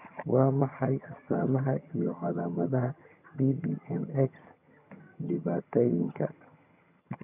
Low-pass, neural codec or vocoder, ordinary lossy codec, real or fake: 3.6 kHz; vocoder, 22.05 kHz, 80 mel bands, HiFi-GAN; none; fake